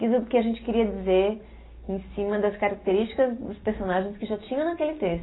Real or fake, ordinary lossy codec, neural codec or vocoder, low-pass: real; AAC, 16 kbps; none; 7.2 kHz